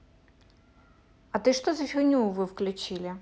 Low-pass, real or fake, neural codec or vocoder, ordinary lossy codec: none; real; none; none